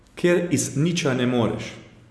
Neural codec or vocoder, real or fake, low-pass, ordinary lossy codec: none; real; none; none